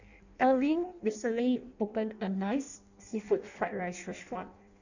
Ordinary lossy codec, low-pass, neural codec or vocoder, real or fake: none; 7.2 kHz; codec, 16 kHz in and 24 kHz out, 0.6 kbps, FireRedTTS-2 codec; fake